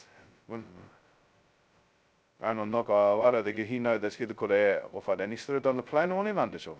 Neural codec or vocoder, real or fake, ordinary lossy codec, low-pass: codec, 16 kHz, 0.2 kbps, FocalCodec; fake; none; none